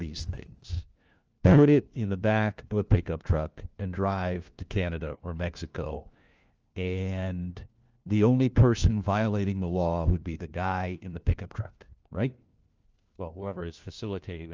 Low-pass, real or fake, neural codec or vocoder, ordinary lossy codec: 7.2 kHz; fake; codec, 16 kHz, 1 kbps, FunCodec, trained on LibriTTS, 50 frames a second; Opus, 24 kbps